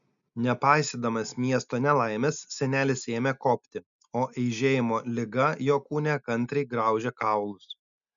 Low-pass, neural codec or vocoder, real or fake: 7.2 kHz; none; real